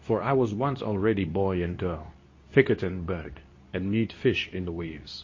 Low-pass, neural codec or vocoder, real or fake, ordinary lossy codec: 7.2 kHz; codec, 24 kHz, 0.9 kbps, WavTokenizer, medium speech release version 2; fake; MP3, 32 kbps